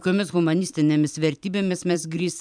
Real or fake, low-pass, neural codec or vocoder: fake; 9.9 kHz; vocoder, 44.1 kHz, 128 mel bands every 256 samples, BigVGAN v2